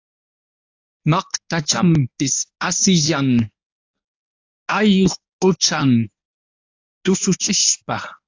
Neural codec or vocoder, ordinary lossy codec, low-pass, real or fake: codec, 24 kHz, 0.9 kbps, WavTokenizer, medium speech release version 1; AAC, 48 kbps; 7.2 kHz; fake